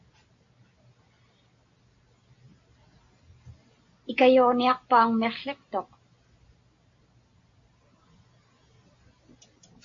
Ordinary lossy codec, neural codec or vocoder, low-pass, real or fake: AAC, 48 kbps; none; 7.2 kHz; real